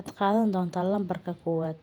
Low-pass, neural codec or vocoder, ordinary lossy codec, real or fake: 19.8 kHz; vocoder, 48 kHz, 128 mel bands, Vocos; none; fake